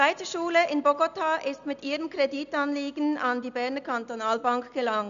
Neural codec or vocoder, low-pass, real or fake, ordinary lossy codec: none; 7.2 kHz; real; none